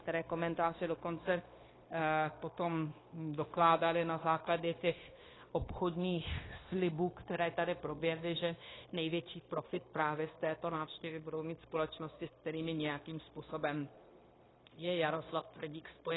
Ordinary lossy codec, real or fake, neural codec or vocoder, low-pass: AAC, 16 kbps; fake; codec, 16 kHz, 0.9 kbps, LongCat-Audio-Codec; 7.2 kHz